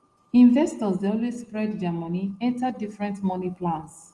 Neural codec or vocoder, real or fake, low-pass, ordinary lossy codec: none; real; 10.8 kHz; Opus, 24 kbps